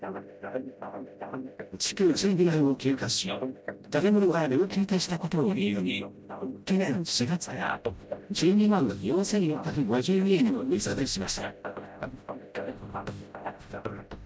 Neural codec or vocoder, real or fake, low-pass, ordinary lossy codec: codec, 16 kHz, 0.5 kbps, FreqCodec, smaller model; fake; none; none